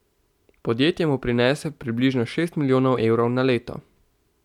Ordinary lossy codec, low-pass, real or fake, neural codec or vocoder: none; 19.8 kHz; real; none